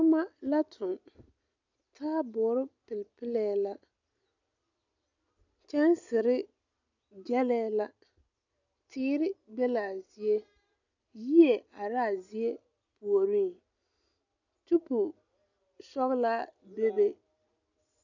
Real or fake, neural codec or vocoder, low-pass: real; none; 7.2 kHz